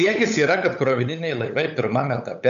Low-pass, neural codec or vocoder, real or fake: 7.2 kHz; codec, 16 kHz, 8 kbps, FunCodec, trained on LibriTTS, 25 frames a second; fake